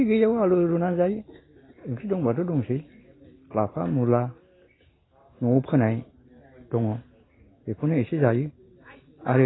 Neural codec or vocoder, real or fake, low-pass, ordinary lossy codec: none; real; 7.2 kHz; AAC, 16 kbps